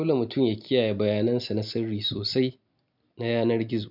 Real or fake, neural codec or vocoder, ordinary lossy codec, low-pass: real; none; none; 5.4 kHz